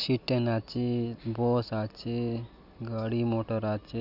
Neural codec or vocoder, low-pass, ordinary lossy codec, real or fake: none; 5.4 kHz; none; real